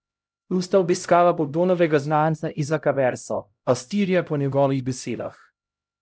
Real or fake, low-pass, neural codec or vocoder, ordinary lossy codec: fake; none; codec, 16 kHz, 0.5 kbps, X-Codec, HuBERT features, trained on LibriSpeech; none